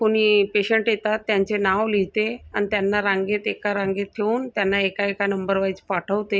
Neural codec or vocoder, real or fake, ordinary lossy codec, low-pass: none; real; none; none